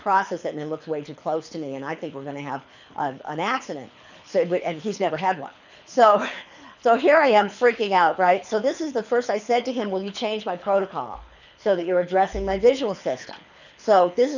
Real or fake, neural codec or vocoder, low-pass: fake; codec, 24 kHz, 6 kbps, HILCodec; 7.2 kHz